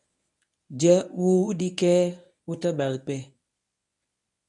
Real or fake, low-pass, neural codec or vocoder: fake; 10.8 kHz; codec, 24 kHz, 0.9 kbps, WavTokenizer, medium speech release version 1